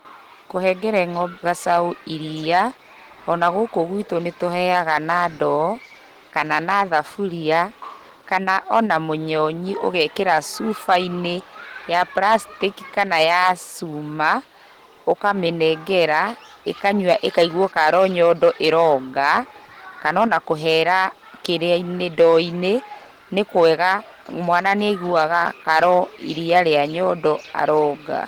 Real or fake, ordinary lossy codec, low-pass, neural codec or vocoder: real; Opus, 16 kbps; 19.8 kHz; none